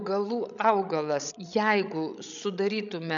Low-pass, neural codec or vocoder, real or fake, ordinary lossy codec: 7.2 kHz; codec, 16 kHz, 16 kbps, FreqCodec, larger model; fake; MP3, 96 kbps